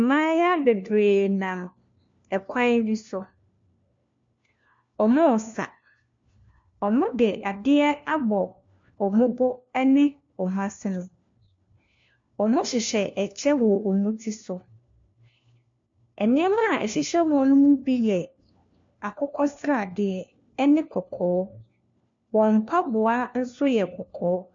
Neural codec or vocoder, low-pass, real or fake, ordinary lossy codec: codec, 16 kHz, 1 kbps, FunCodec, trained on LibriTTS, 50 frames a second; 7.2 kHz; fake; MP3, 48 kbps